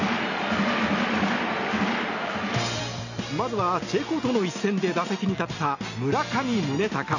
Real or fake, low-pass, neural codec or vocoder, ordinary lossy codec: real; 7.2 kHz; none; none